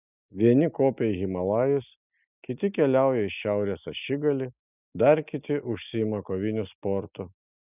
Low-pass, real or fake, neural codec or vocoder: 3.6 kHz; real; none